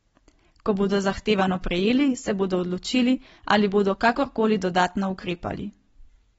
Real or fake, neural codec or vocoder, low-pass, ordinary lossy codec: real; none; 19.8 kHz; AAC, 24 kbps